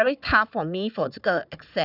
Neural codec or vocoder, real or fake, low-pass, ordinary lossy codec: codec, 16 kHz, 4 kbps, FunCodec, trained on Chinese and English, 50 frames a second; fake; 5.4 kHz; none